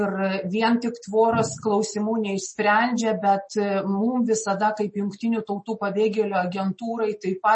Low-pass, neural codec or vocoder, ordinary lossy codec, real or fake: 9.9 kHz; none; MP3, 32 kbps; real